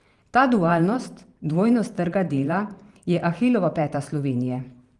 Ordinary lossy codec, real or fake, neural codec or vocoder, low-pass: Opus, 24 kbps; fake; vocoder, 44.1 kHz, 128 mel bands every 512 samples, BigVGAN v2; 10.8 kHz